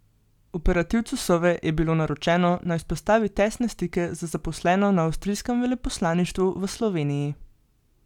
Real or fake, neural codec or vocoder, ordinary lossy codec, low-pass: real; none; none; 19.8 kHz